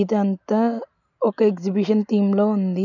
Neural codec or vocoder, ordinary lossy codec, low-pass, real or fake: none; none; 7.2 kHz; real